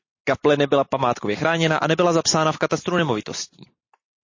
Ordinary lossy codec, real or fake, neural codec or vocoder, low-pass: MP3, 32 kbps; real; none; 7.2 kHz